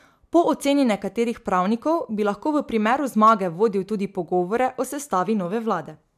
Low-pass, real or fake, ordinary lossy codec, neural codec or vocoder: 14.4 kHz; real; MP3, 96 kbps; none